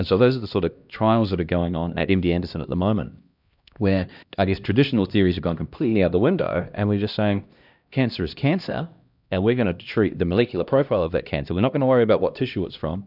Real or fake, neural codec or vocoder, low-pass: fake; codec, 16 kHz, 1 kbps, X-Codec, HuBERT features, trained on LibriSpeech; 5.4 kHz